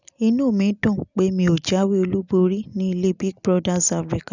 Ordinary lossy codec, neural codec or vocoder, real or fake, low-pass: none; none; real; 7.2 kHz